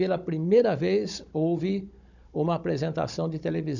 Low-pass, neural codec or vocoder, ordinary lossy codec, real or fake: 7.2 kHz; codec, 16 kHz, 16 kbps, FunCodec, trained on Chinese and English, 50 frames a second; none; fake